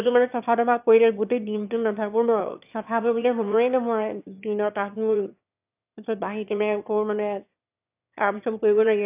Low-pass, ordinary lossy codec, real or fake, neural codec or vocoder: 3.6 kHz; AAC, 32 kbps; fake; autoencoder, 22.05 kHz, a latent of 192 numbers a frame, VITS, trained on one speaker